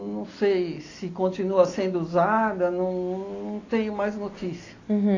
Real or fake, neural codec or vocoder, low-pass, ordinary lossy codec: real; none; 7.2 kHz; AAC, 32 kbps